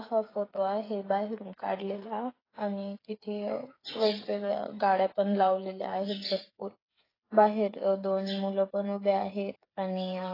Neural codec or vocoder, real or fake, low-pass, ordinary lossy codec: codec, 16 kHz, 8 kbps, FreqCodec, smaller model; fake; 5.4 kHz; AAC, 24 kbps